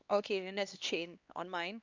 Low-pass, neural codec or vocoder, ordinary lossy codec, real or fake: 7.2 kHz; codec, 16 kHz, 2 kbps, X-Codec, HuBERT features, trained on LibriSpeech; Opus, 64 kbps; fake